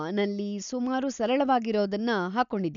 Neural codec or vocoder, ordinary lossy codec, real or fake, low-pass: none; none; real; 7.2 kHz